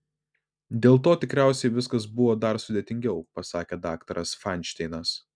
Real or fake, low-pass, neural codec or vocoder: real; 9.9 kHz; none